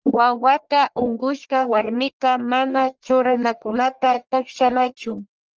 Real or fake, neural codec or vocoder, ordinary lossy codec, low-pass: fake; codec, 44.1 kHz, 1.7 kbps, Pupu-Codec; Opus, 24 kbps; 7.2 kHz